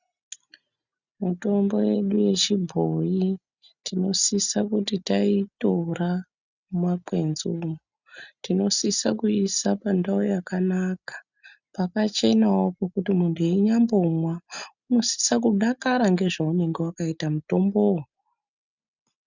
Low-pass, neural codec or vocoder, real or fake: 7.2 kHz; none; real